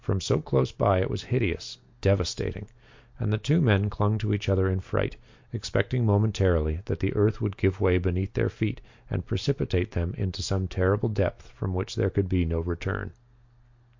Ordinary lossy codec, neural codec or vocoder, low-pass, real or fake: MP3, 48 kbps; none; 7.2 kHz; real